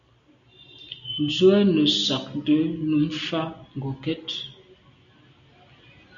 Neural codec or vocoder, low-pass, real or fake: none; 7.2 kHz; real